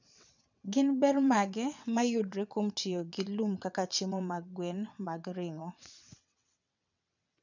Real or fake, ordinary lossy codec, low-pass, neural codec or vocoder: fake; none; 7.2 kHz; vocoder, 22.05 kHz, 80 mel bands, WaveNeXt